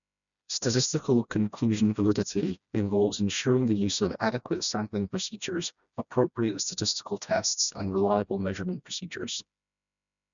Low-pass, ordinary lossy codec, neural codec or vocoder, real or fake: 7.2 kHz; none; codec, 16 kHz, 1 kbps, FreqCodec, smaller model; fake